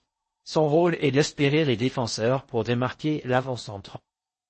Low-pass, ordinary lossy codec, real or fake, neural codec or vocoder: 10.8 kHz; MP3, 32 kbps; fake; codec, 16 kHz in and 24 kHz out, 0.6 kbps, FocalCodec, streaming, 4096 codes